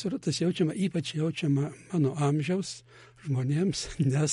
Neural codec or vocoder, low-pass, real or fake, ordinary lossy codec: none; 10.8 kHz; real; MP3, 48 kbps